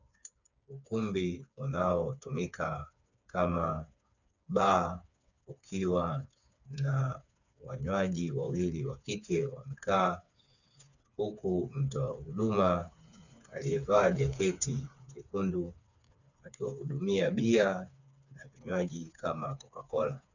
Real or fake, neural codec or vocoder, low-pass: fake; codec, 16 kHz, 4 kbps, FreqCodec, smaller model; 7.2 kHz